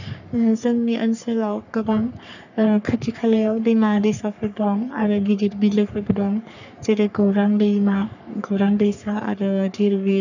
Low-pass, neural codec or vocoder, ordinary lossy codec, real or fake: 7.2 kHz; codec, 44.1 kHz, 3.4 kbps, Pupu-Codec; none; fake